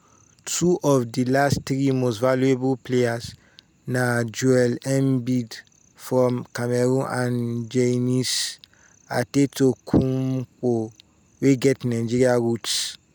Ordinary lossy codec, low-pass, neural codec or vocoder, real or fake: none; none; none; real